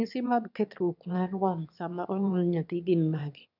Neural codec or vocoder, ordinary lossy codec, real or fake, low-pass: autoencoder, 22.05 kHz, a latent of 192 numbers a frame, VITS, trained on one speaker; none; fake; 5.4 kHz